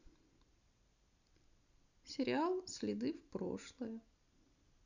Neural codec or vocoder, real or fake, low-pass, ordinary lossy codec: none; real; 7.2 kHz; none